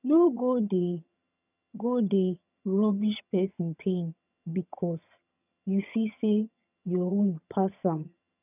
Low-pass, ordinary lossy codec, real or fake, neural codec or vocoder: 3.6 kHz; none; fake; vocoder, 22.05 kHz, 80 mel bands, HiFi-GAN